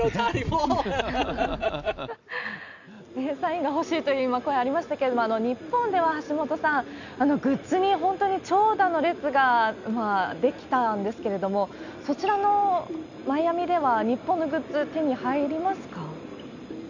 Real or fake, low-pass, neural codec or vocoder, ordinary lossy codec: real; 7.2 kHz; none; none